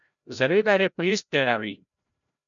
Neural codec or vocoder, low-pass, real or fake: codec, 16 kHz, 0.5 kbps, FreqCodec, larger model; 7.2 kHz; fake